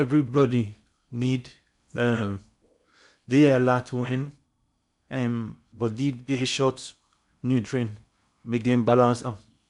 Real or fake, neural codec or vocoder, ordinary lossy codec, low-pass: fake; codec, 16 kHz in and 24 kHz out, 0.6 kbps, FocalCodec, streaming, 2048 codes; none; 10.8 kHz